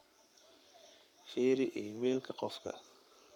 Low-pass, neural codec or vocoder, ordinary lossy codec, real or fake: 19.8 kHz; codec, 44.1 kHz, 7.8 kbps, Pupu-Codec; none; fake